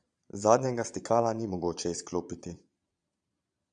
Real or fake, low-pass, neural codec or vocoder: fake; 9.9 kHz; vocoder, 22.05 kHz, 80 mel bands, Vocos